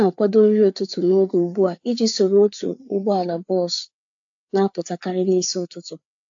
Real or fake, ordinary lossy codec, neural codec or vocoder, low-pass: fake; AAC, 64 kbps; codec, 16 kHz, 4 kbps, FreqCodec, larger model; 7.2 kHz